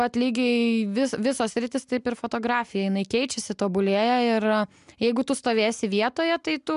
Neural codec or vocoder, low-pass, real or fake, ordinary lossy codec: none; 10.8 kHz; real; MP3, 96 kbps